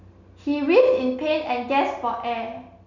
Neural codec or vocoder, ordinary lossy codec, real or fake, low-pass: none; none; real; 7.2 kHz